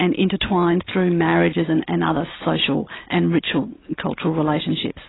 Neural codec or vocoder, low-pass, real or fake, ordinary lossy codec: none; 7.2 kHz; real; AAC, 16 kbps